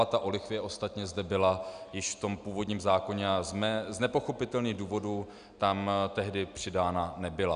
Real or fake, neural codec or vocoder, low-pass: real; none; 9.9 kHz